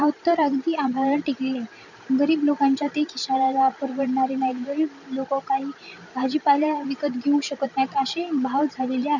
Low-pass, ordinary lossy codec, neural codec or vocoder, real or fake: 7.2 kHz; none; vocoder, 44.1 kHz, 128 mel bands every 512 samples, BigVGAN v2; fake